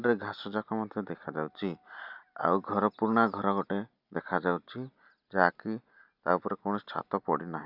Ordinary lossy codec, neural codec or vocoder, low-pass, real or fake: none; none; 5.4 kHz; real